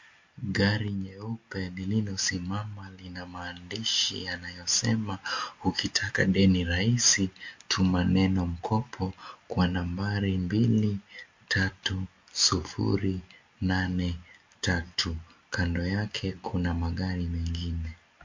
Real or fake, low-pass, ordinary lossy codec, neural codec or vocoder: real; 7.2 kHz; MP3, 48 kbps; none